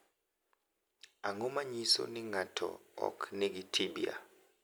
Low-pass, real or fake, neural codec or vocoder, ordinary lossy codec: none; real; none; none